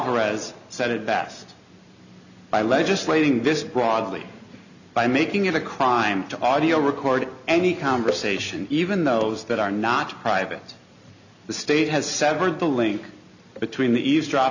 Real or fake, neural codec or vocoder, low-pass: real; none; 7.2 kHz